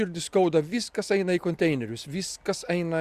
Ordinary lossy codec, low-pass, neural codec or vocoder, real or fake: Opus, 64 kbps; 14.4 kHz; none; real